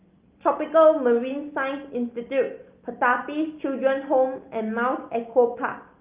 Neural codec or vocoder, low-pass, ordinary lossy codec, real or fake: none; 3.6 kHz; Opus, 32 kbps; real